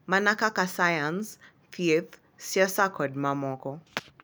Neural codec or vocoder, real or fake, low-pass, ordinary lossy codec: none; real; none; none